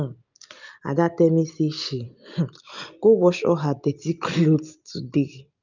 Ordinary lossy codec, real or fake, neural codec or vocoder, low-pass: none; real; none; 7.2 kHz